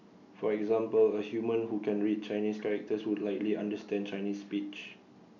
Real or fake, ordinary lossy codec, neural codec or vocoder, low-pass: real; none; none; 7.2 kHz